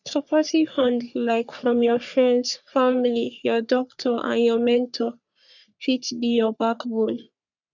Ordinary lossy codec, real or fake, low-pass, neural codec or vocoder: none; fake; 7.2 kHz; codec, 44.1 kHz, 3.4 kbps, Pupu-Codec